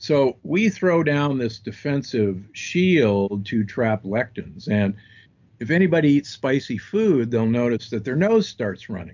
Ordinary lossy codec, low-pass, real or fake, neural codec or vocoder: MP3, 64 kbps; 7.2 kHz; real; none